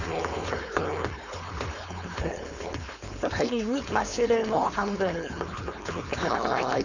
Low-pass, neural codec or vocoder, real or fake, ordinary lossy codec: 7.2 kHz; codec, 16 kHz, 4.8 kbps, FACodec; fake; none